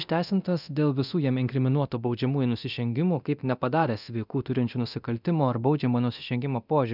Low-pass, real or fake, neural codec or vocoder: 5.4 kHz; fake; codec, 24 kHz, 0.9 kbps, DualCodec